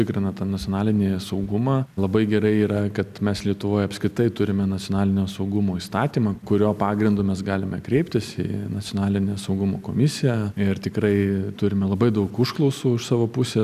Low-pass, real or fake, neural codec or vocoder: 14.4 kHz; real; none